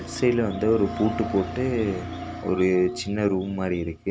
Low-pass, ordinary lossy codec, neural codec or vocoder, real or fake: none; none; none; real